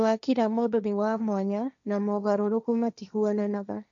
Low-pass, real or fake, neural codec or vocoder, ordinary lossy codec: 7.2 kHz; fake; codec, 16 kHz, 1.1 kbps, Voila-Tokenizer; none